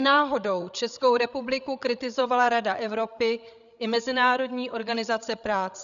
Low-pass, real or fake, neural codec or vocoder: 7.2 kHz; fake; codec, 16 kHz, 16 kbps, FreqCodec, larger model